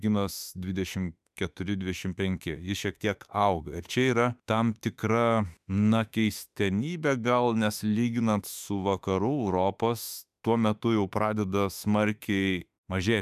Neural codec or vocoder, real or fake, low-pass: autoencoder, 48 kHz, 32 numbers a frame, DAC-VAE, trained on Japanese speech; fake; 14.4 kHz